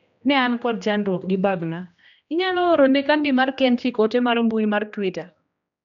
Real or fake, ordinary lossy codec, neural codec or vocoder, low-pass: fake; none; codec, 16 kHz, 1 kbps, X-Codec, HuBERT features, trained on general audio; 7.2 kHz